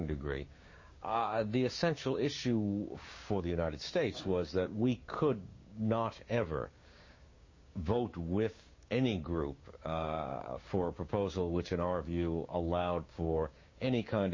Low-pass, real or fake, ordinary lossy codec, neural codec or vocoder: 7.2 kHz; fake; MP3, 32 kbps; codec, 16 kHz, 6 kbps, DAC